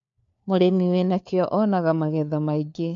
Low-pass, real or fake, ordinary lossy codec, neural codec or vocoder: 7.2 kHz; fake; MP3, 64 kbps; codec, 16 kHz, 4 kbps, FunCodec, trained on LibriTTS, 50 frames a second